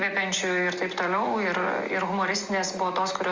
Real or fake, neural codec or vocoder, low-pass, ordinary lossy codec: real; none; 7.2 kHz; Opus, 32 kbps